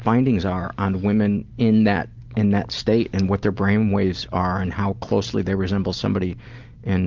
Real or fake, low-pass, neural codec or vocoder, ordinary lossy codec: real; 7.2 kHz; none; Opus, 24 kbps